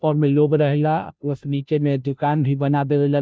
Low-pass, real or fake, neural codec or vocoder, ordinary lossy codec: none; fake; codec, 16 kHz, 0.5 kbps, FunCodec, trained on Chinese and English, 25 frames a second; none